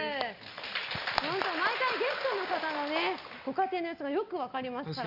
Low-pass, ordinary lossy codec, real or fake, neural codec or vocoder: 5.4 kHz; Opus, 64 kbps; real; none